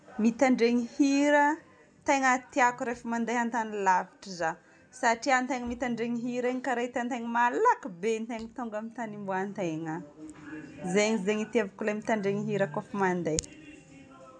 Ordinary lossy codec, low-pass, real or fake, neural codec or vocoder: none; 9.9 kHz; real; none